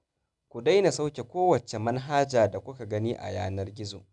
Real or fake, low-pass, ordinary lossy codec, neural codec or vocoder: real; 10.8 kHz; none; none